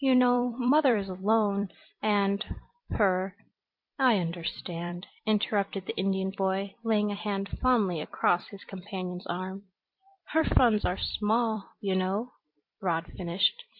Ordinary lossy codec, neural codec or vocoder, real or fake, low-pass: MP3, 48 kbps; vocoder, 44.1 kHz, 128 mel bands every 256 samples, BigVGAN v2; fake; 5.4 kHz